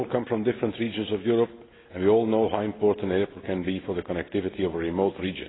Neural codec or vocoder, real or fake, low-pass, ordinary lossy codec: none; real; 7.2 kHz; AAC, 16 kbps